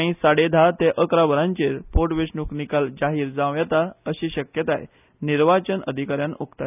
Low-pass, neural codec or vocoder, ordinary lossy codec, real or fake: 3.6 kHz; none; none; real